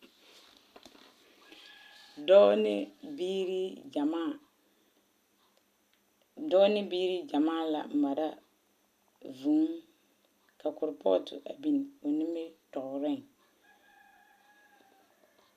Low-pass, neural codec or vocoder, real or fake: 14.4 kHz; none; real